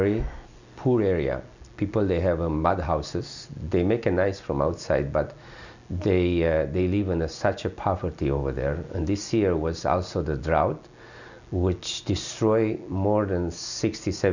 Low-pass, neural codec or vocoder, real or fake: 7.2 kHz; none; real